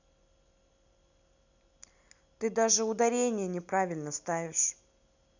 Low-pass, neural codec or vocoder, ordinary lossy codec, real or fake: 7.2 kHz; none; none; real